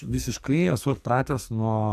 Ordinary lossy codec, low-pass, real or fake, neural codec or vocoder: AAC, 96 kbps; 14.4 kHz; fake; codec, 44.1 kHz, 2.6 kbps, SNAC